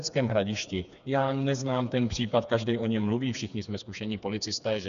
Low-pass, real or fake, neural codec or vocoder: 7.2 kHz; fake; codec, 16 kHz, 4 kbps, FreqCodec, smaller model